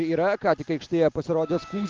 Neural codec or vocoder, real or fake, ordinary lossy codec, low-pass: none; real; Opus, 24 kbps; 7.2 kHz